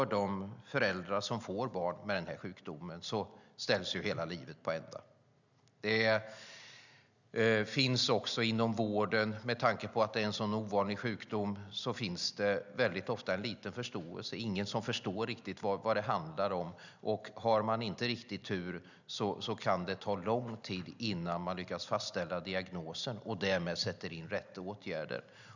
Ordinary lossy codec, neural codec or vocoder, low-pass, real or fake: none; none; 7.2 kHz; real